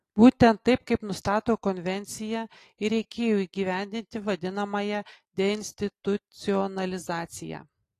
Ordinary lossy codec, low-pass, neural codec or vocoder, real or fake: AAC, 48 kbps; 14.4 kHz; none; real